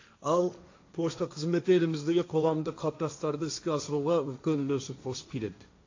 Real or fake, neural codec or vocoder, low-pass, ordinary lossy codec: fake; codec, 16 kHz, 1.1 kbps, Voila-Tokenizer; 7.2 kHz; AAC, 48 kbps